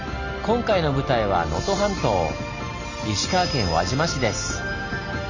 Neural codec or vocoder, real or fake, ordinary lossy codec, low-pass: none; real; none; 7.2 kHz